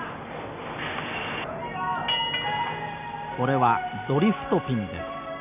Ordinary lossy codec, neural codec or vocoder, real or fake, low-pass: none; none; real; 3.6 kHz